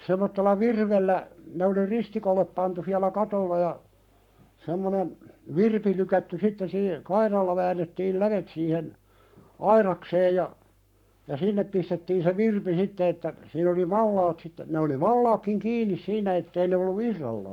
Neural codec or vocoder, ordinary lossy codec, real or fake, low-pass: codec, 44.1 kHz, 7.8 kbps, Pupu-Codec; none; fake; 19.8 kHz